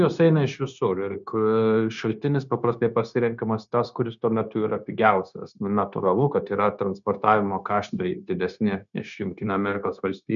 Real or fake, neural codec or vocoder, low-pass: fake; codec, 16 kHz, 0.9 kbps, LongCat-Audio-Codec; 7.2 kHz